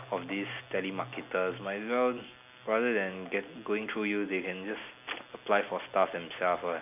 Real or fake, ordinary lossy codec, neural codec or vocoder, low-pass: real; none; none; 3.6 kHz